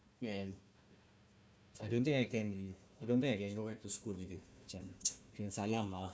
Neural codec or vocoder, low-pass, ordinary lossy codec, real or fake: codec, 16 kHz, 1 kbps, FunCodec, trained on Chinese and English, 50 frames a second; none; none; fake